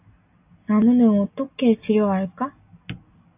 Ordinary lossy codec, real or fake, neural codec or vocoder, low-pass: AAC, 32 kbps; real; none; 3.6 kHz